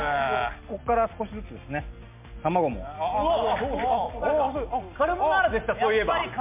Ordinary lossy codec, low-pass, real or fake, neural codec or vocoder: MP3, 32 kbps; 3.6 kHz; real; none